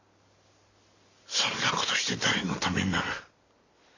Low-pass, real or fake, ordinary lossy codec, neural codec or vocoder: 7.2 kHz; real; none; none